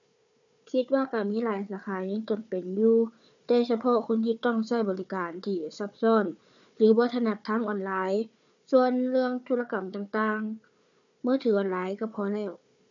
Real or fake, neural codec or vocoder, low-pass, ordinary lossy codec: fake; codec, 16 kHz, 4 kbps, FunCodec, trained on Chinese and English, 50 frames a second; 7.2 kHz; none